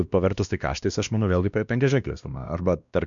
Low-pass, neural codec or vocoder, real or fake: 7.2 kHz; codec, 16 kHz, 1 kbps, X-Codec, WavLM features, trained on Multilingual LibriSpeech; fake